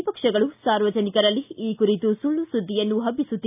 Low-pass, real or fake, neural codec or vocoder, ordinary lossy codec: 3.6 kHz; real; none; AAC, 32 kbps